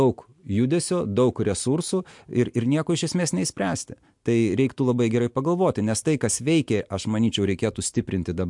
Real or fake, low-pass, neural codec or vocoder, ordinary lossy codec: real; 10.8 kHz; none; MP3, 64 kbps